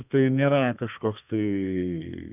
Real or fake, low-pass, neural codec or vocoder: fake; 3.6 kHz; codec, 32 kHz, 1.9 kbps, SNAC